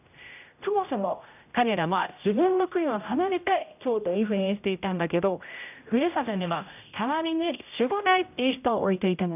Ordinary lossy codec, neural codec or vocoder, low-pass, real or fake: none; codec, 16 kHz, 0.5 kbps, X-Codec, HuBERT features, trained on general audio; 3.6 kHz; fake